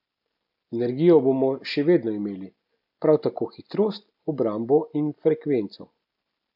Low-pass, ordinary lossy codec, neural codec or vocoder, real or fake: 5.4 kHz; none; none; real